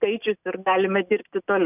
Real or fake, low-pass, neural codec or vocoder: real; 3.6 kHz; none